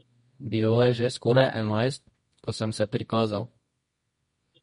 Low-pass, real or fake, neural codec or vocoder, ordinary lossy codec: 10.8 kHz; fake; codec, 24 kHz, 0.9 kbps, WavTokenizer, medium music audio release; MP3, 48 kbps